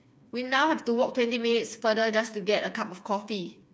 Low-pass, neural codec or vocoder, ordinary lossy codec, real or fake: none; codec, 16 kHz, 4 kbps, FreqCodec, smaller model; none; fake